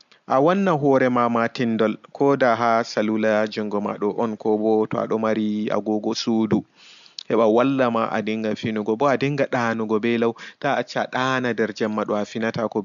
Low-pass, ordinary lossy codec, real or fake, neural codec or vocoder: 7.2 kHz; none; real; none